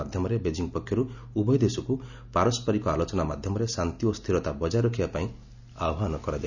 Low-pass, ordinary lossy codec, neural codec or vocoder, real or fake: 7.2 kHz; none; none; real